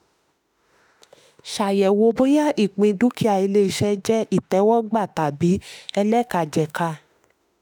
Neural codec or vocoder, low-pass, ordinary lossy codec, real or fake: autoencoder, 48 kHz, 32 numbers a frame, DAC-VAE, trained on Japanese speech; none; none; fake